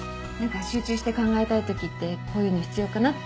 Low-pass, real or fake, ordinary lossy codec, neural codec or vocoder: none; real; none; none